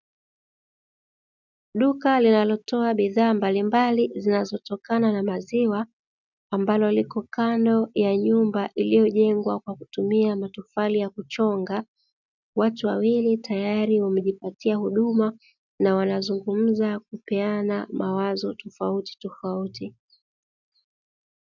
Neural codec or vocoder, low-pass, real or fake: none; 7.2 kHz; real